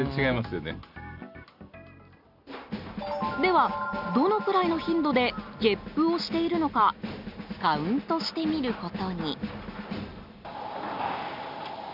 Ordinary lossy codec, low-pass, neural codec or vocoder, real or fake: none; 5.4 kHz; none; real